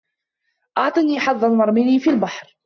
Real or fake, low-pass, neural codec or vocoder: real; 7.2 kHz; none